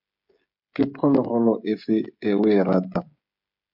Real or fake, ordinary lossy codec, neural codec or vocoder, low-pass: fake; MP3, 48 kbps; codec, 16 kHz, 16 kbps, FreqCodec, smaller model; 5.4 kHz